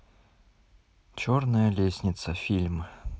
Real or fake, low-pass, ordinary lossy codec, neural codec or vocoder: real; none; none; none